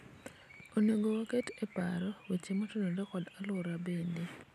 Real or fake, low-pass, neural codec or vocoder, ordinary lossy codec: real; 14.4 kHz; none; none